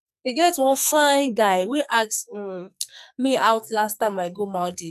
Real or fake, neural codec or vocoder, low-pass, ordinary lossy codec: fake; codec, 44.1 kHz, 2.6 kbps, SNAC; 14.4 kHz; none